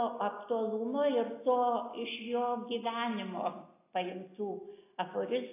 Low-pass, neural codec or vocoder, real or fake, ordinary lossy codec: 3.6 kHz; none; real; AAC, 24 kbps